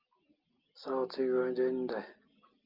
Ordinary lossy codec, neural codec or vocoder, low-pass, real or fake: Opus, 24 kbps; none; 5.4 kHz; real